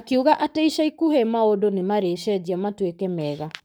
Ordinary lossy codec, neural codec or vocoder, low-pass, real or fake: none; codec, 44.1 kHz, 7.8 kbps, Pupu-Codec; none; fake